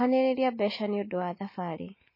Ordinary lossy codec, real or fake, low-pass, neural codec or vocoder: MP3, 24 kbps; real; 5.4 kHz; none